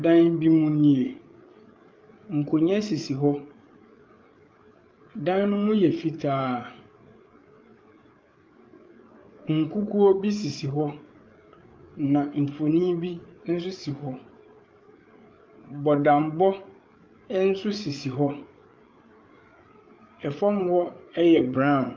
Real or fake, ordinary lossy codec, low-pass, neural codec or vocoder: fake; Opus, 24 kbps; 7.2 kHz; codec, 16 kHz, 8 kbps, FreqCodec, larger model